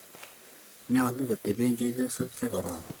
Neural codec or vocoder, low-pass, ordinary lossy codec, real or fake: codec, 44.1 kHz, 1.7 kbps, Pupu-Codec; none; none; fake